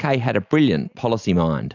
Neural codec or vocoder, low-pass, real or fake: none; 7.2 kHz; real